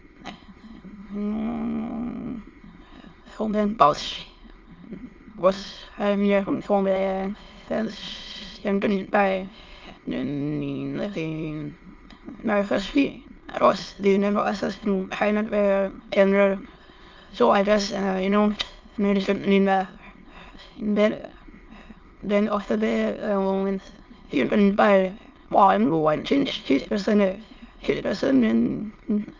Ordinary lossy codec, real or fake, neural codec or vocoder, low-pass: Opus, 32 kbps; fake; autoencoder, 22.05 kHz, a latent of 192 numbers a frame, VITS, trained on many speakers; 7.2 kHz